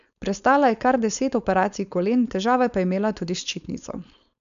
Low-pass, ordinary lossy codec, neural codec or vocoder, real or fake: 7.2 kHz; none; codec, 16 kHz, 4.8 kbps, FACodec; fake